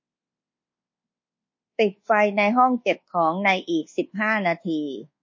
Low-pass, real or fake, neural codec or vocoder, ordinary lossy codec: 7.2 kHz; fake; codec, 24 kHz, 1.2 kbps, DualCodec; MP3, 32 kbps